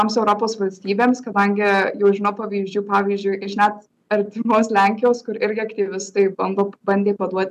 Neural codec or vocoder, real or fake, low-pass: none; real; 14.4 kHz